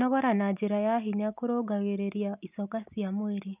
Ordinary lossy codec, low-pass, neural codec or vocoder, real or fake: none; 3.6 kHz; none; real